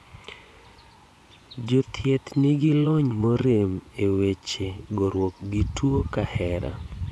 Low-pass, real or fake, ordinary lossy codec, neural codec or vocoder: none; fake; none; vocoder, 24 kHz, 100 mel bands, Vocos